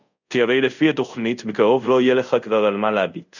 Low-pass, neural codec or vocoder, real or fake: 7.2 kHz; codec, 24 kHz, 0.5 kbps, DualCodec; fake